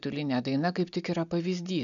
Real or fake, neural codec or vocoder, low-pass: real; none; 7.2 kHz